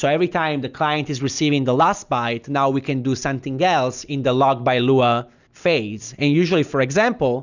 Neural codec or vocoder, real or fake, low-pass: none; real; 7.2 kHz